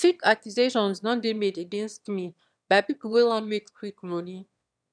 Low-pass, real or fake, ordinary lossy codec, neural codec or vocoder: 9.9 kHz; fake; none; autoencoder, 22.05 kHz, a latent of 192 numbers a frame, VITS, trained on one speaker